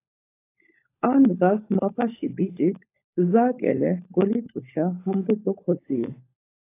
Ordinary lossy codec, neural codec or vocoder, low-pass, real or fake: AAC, 24 kbps; codec, 16 kHz, 16 kbps, FunCodec, trained on LibriTTS, 50 frames a second; 3.6 kHz; fake